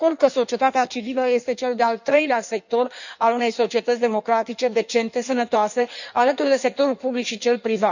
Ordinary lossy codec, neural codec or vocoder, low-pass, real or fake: none; codec, 16 kHz in and 24 kHz out, 1.1 kbps, FireRedTTS-2 codec; 7.2 kHz; fake